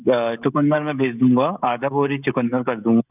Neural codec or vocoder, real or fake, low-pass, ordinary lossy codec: codec, 16 kHz, 16 kbps, FreqCodec, smaller model; fake; 3.6 kHz; none